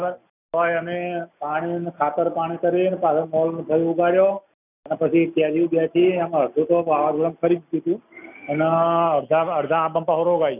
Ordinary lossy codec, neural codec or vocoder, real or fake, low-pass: none; none; real; 3.6 kHz